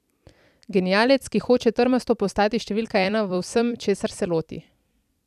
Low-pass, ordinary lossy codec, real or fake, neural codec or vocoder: 14.4 kHz; none; fake; vocoder, 44.1 kHz, 128 mel bands every 256 samples, BigVGAN v2